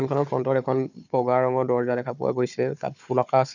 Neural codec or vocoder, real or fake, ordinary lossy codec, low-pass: codec, 16 kHz, 4 kbps, FunCodec, trained on Chinese and English, 50 frames a second; fake; none; 7.2 kHz